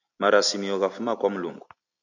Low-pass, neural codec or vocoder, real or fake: 7.2 kHz; none; real